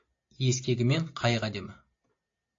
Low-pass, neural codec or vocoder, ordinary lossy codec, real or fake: 7.2 kHz; none; AAC, 48 kbps; real